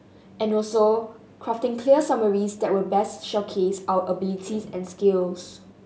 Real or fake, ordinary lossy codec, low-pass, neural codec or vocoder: real; none; none; none